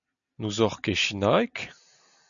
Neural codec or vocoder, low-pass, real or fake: none; 7.2 kHz; real